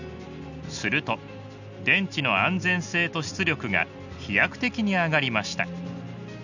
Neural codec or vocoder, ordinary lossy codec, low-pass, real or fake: none; none; 7.2 kHz; real